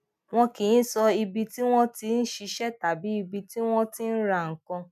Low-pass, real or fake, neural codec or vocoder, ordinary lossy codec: 14.4 kHz; real; none; none